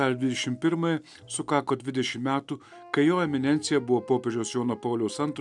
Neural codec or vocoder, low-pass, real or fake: none; 10.8 kHz; real